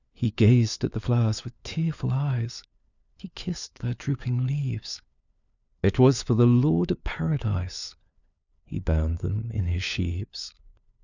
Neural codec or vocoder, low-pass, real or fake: codec, 16 kHz, 4 kbps, FunCodec, trained on LibriTTS, 50 frames a second; 7.2 kHz; fake